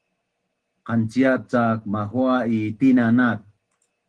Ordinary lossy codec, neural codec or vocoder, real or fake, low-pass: Opus, 16 kbps; none; real; 9.9 kHz